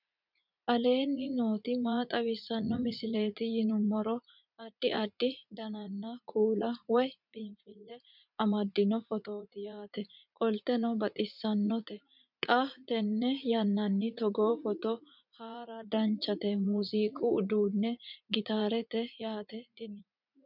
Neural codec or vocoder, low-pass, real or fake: vocoder, 44.1 kHz, 80 mel bands, Vocos; 5.4 kHz; fake